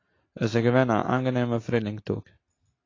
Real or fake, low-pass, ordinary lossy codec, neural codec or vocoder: real; 7.2 kHz; AAC, 32 kbps; none